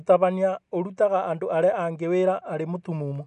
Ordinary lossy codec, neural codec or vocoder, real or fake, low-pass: none; none; real; 10.8 kHz